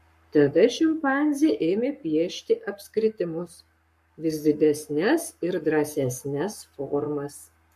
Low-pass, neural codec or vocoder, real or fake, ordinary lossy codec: 14.4 kHz; codec, 44.1 kHz, 7.8 kbps, Pupu-Codec; fake; MP3, 64 kbps